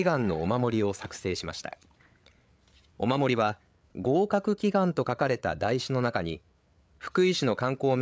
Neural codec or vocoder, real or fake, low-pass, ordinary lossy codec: codec, 16 kHz, 8 kbps, FreqCodec, larger model; fake; none; none